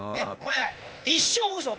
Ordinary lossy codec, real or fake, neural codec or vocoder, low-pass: none; fake; codec, 16 kHz, 0.8 kbps, ZipCodec; none